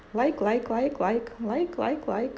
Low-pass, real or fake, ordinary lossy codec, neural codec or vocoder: none; real; none; none